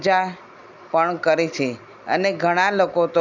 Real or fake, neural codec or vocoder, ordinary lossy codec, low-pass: real; none; none; 7.2 kHz